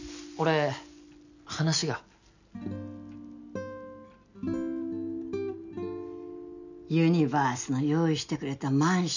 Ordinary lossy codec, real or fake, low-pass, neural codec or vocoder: none; real; 7.2 kHz; none